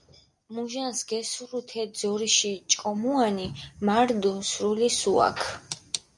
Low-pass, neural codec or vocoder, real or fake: 10.8 kHz; none; real